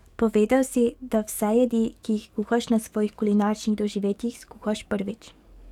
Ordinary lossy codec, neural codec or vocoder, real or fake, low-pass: none; codec, 44.1 kHz, 7.8 kbps, DAC; fake; 19.8 kHz